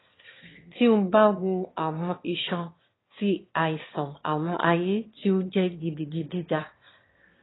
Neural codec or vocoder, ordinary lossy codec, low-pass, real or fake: autoencoder, 22.05 kHz, a latent of 192 numbers a frame, VITS, trained on one speaker; AAC, 16 kbps; 7.2 kHz; fake